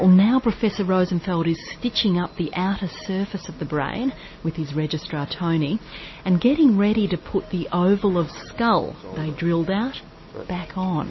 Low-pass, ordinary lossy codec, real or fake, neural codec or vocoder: 7.2 kHz; MP3, 24 kbps; real; none